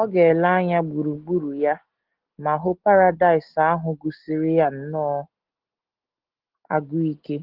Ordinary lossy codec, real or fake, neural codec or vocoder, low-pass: Opus, 16 kbps; real; none; 5.4 kHz